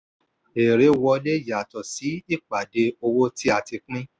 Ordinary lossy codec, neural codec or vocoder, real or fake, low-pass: none; none; real; none